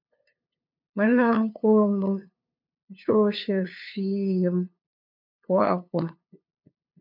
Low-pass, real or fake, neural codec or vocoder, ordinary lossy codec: 5.4 kHz; fake; codec, 16 kHz, 2 kbps, FunCodec, trained on LibriTTS, 25 frames a second; MP3, 48 kbps